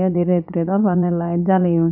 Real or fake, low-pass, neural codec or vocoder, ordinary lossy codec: fake; 5.4 kHz; vocoder, 44.1 kHz, 80 mel bands, Vocos; none